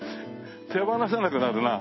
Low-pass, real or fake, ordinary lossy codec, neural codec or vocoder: 7.2 kHz; real; MP3, 24 kbps; none